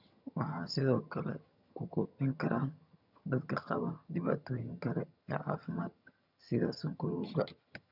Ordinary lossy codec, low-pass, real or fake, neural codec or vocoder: none; 5.4 kHz; fake; vocoder, 22.05 kHz, 80 mel bands, HiFi-GAN